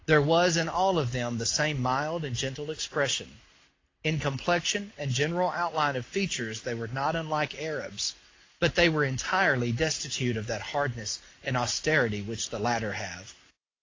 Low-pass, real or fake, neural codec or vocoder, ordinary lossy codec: 7.2 kHz; real; none; AAC, 32 kbps